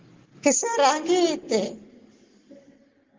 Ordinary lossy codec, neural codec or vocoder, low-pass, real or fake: Opus, 16 kbps; none; 7.2 kHz; real